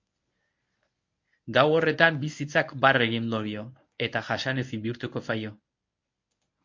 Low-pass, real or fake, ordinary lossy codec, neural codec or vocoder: 7.2 kHz; fake; MP3, 48 kbps; codec, 24 kHz, 0.9 kbps, WavTokenizer, medium speech release version 1